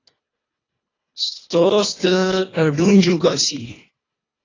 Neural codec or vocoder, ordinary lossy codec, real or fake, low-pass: codec, 24 kHz, 1.5 kbps, HILCodec; AAC, 32 kbps; fake; 7.2 kHz